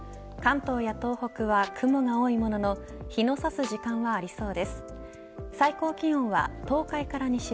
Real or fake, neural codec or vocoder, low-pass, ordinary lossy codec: real; none; none; none